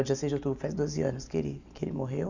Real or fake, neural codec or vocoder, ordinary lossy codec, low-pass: real; none; none; 7.2 kHz